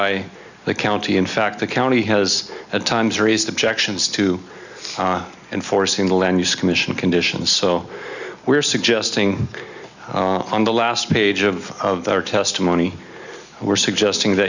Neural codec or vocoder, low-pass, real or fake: none; 7.2 kHz; real